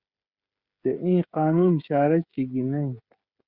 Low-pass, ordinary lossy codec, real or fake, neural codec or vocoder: 5.4 kHz; Opus, 64 kbps; fake; codec, 16 kHz, 8 kbps, FreqCodec, smaller model